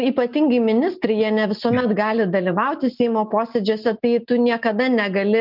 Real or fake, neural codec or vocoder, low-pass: real; none; 5.4 kHz